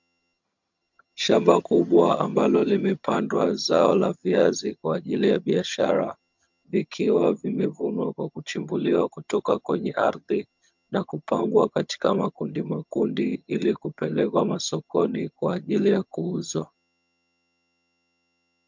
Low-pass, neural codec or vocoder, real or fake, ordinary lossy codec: 7.2 kHz; vocoder, 22.05 kHz, 80 mel bands, HiFi-GAN; fake; MP3, 64 kbps